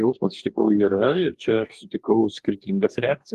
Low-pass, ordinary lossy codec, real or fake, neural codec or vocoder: 14.4 kHz; Opus, 24 kbps; fake; codec, 44.1 kHz, 2.6 kbps, DAC